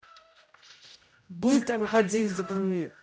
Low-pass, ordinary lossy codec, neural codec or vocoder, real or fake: none; none; codec, 16 kHz, 0.5 kbps, X-Codec, HuBERT features, trained on general audio; fake